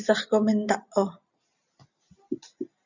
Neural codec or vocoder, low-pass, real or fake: none; 7.2 kHz; real